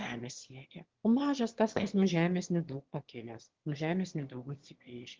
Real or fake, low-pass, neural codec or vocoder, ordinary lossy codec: fake; 7.2 kHz; autoencoder, 22.05 kHz, a latent of 192 numbers a frame, VITS, trained on one speaker; Opus, 16 kbps